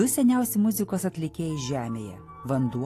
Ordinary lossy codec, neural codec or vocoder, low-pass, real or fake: AAC, 48 kbps; none; 14.4 kHz; real